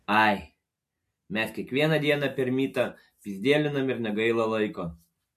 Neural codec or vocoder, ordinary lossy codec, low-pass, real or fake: none; MP3, 64 kbps; 14.4 kHz; real